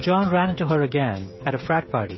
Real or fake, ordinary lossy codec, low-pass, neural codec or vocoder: fake; MP3, 24 kbps; 7.2 kHz; codec, 16 kHz, 16 kbps, FreqCodec, smaller model